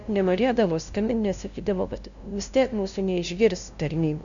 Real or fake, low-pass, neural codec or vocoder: fake; 7.2 kHz; codec, 16 kHz, 0.5 kbps, FunCodec, trained on LibriTTS, 25 frames a second